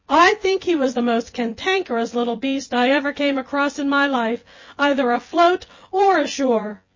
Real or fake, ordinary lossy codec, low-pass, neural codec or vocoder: fake; MP3, 32 kbps; 7.2 kHz; vocoder, 24 kHz, 100 mel bands, Vocos